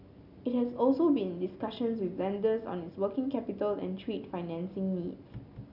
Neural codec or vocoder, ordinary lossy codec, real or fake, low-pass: none; none; real; 5.4 kHz